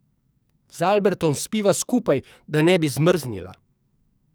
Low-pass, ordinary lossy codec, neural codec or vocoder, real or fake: none; none; codec, 44.1 kHz, 2.6 kbps, SNAC; fake